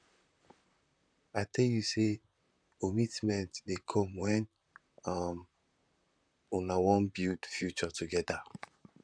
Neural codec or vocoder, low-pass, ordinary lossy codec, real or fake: vocoder, 44.1 kHz, 128 mel bands, Pupu-Vocoder; 9.9 kHz; none; fake